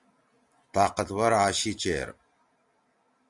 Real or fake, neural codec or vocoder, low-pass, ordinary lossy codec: real; none; 10.8 kHz; MP3, 96 kbps